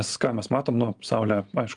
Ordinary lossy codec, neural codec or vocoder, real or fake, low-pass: Opus, 32 kbps; none; real; 9.9 kHz